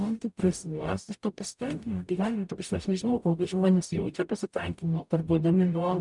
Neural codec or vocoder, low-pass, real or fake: codec, 44.1 kHz, 0.9 kbps, DAC; 10.8 kHz; fake